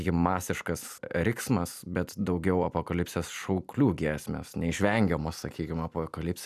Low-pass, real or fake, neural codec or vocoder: 14.4 kHz; real; none